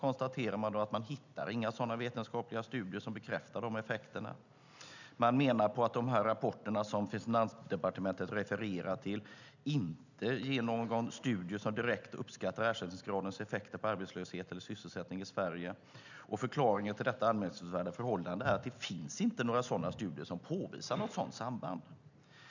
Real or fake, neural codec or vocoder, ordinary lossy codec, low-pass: real; none; none; 7.2 kHz